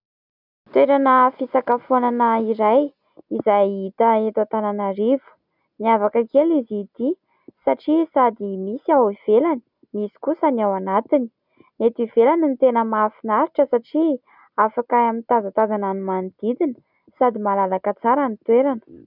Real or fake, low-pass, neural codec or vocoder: real; 5.4 kHz; none